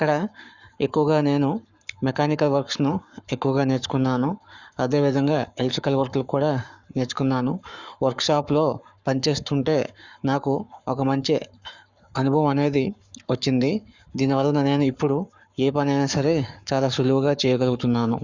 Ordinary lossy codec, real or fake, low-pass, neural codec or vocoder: Opus, 64 kbps; fake; 7.2 kHz; codec, 44.1 kHz, 7.8 kbps, Pupu-Codec